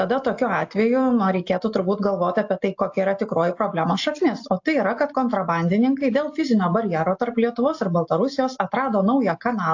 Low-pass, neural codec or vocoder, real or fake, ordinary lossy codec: 7.2 kHz; none; real; AAC, 48 kbps